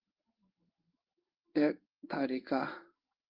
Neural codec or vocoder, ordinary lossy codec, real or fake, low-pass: codec, 16 kHz in and 24 kHz out, 1 kbps, XY-Tokenizer; Opus, 32 kbps; fake; 5.4 kHz